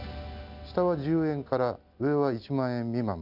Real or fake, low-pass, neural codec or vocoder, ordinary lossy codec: real; 5.4 kHz; none; none